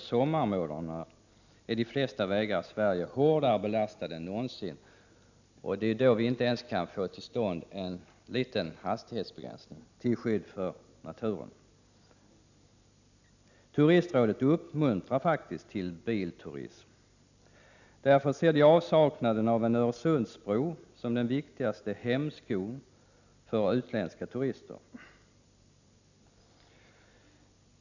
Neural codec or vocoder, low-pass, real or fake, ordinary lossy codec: none; 7.2 kHz; real; none